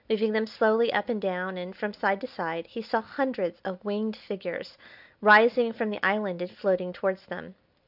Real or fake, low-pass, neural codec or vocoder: real; 5.4 kHz; none